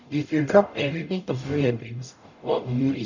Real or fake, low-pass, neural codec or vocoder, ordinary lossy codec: fake; 7.2 kHz; codec, 44.1 kHz, 0.9 kbps, DAC; none